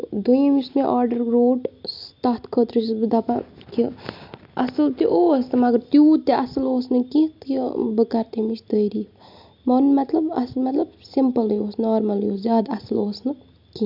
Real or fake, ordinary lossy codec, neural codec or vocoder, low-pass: real; MP3, 48 kbps; none; 5.4 kHz